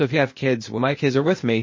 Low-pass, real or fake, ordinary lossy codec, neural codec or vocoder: 7.2 kHz; fake; MP3, 32 kbps; codec, 16 kHz, 0.8 kbps, ZipCodec